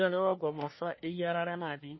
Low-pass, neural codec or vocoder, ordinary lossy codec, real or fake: 7.2 kHz; codec, 24 kHz, 1 kbps, SNAC; MP3, 24 kbps; fake